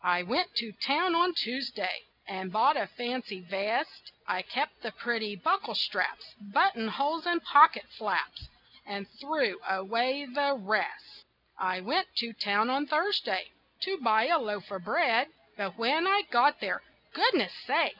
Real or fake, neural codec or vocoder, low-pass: real; none; 5.4 kHz